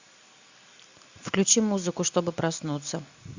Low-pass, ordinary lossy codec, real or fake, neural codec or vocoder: 7.2 kHz; Opus, 64 kbps; real; none